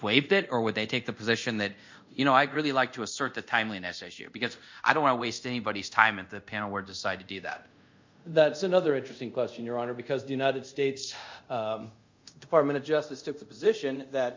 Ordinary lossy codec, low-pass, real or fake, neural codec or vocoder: MP3, 64 kbps; 7.2 kHz; fake; codec, 24 kHz, 0.5 kbps, DualCodec